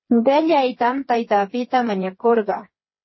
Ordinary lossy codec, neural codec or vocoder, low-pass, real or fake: MP3, 24 kbps; codec, 16 kHz, 4 kbps, FreqCodec, smaller model; 7.2 kHz; fake